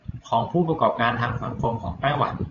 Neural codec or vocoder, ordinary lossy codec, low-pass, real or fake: codec, 16 kHz, 16 kbps, FreqCodec, larger model; AAC, 48 kbps; 7.2 kHz; fake